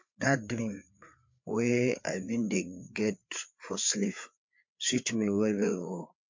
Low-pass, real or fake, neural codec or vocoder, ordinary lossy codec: 7.2 kHz; fake; codec, 16 kHz, 4 kbps, FreqCodec, larger model; MP3, 48 kbps